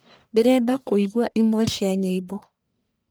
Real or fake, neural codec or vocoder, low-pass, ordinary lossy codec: fake; codec, 44.1 kHz, 1.7 kbps, Pupu-Codec; none; none